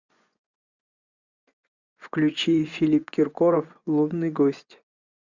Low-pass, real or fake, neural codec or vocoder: 7.2 kHz; fake; vocoder, 24 kHz, 100 mel bands, Vocos